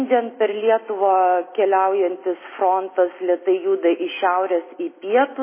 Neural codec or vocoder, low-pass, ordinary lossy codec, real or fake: none; 3.6 kHz; MP3, 16 kbps; real